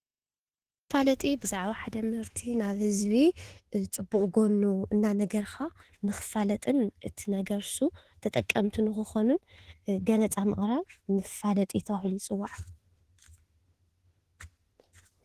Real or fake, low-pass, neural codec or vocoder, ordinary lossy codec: fake; 14.4 kHz; autoencoder, 48 kHz, 32 numbers a frame, DAC-VAE, trained on Japanese speech; Opus, 16 kbps